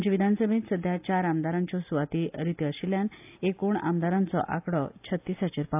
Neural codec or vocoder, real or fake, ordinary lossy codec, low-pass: none; real; AAC, 32 kbps; 3.6 kHz